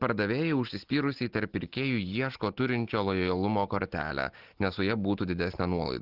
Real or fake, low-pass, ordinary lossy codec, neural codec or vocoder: real; 5.4 kHz; Opus, 16 kbps; none